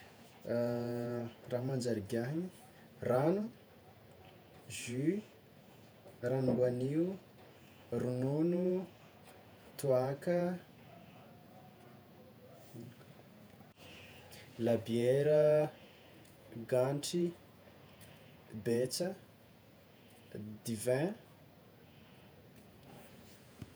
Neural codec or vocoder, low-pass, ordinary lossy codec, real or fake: vocoder, 48 kHz, 128 mel bands, Vocos; none; none; fake